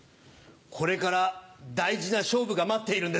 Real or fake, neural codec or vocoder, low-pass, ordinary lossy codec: real; none; none; none